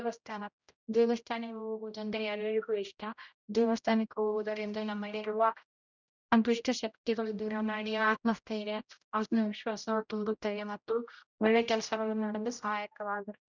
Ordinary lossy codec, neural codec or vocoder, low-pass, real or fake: none; codec, 16 kHz, 0.5 kbps, X-Codec, HuBERT features, trained on general audio; 7.2 kHz; fake